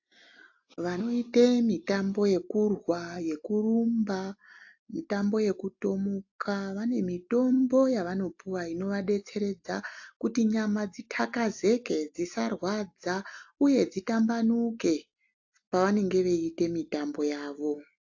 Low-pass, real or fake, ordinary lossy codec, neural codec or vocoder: 7.2 kHz; real; AAC, 48 kbps; none